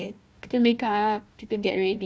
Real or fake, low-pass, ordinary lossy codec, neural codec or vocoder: fake; none; none; codec, 16 kHz, 1 kbps, FunCodec, trained on Chinese and English, 50 frames a second